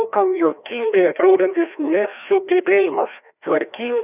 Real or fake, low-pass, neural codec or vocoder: fake; 3.6 kHz; codec, 16 kHz, 1 kbps, FreqCodec, larger model